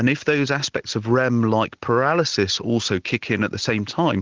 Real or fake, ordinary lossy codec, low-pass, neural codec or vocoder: real; Opus, 16 kbps; 7.2 kHz; none